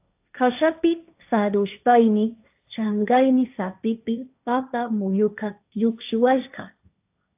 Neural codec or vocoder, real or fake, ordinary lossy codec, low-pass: codec, 16 kHz, 1.1 kbps, Voila-Tokenizer; fake; AAC, 32 kbps; 3.6 kHz